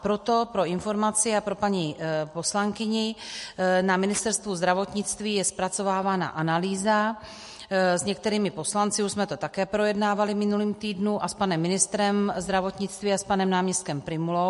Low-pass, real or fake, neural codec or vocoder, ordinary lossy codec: 14.4 kHz; real; none; MP3, 48 kbps